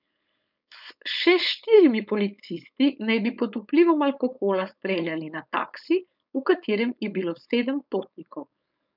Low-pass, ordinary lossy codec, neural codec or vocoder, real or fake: 5.4 kHz; none; codec, 16 kHz, 4.8 kbps, FACodec; fake